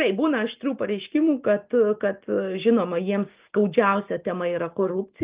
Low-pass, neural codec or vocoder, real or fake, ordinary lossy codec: 3.6 kHz; none; real; Opus, 32 kbps